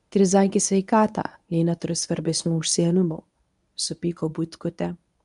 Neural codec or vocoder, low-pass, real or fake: codec, 24 kHz, 0.9 kbps, WavTokenizer, medium speech release version 1; 10.8 kHz; fake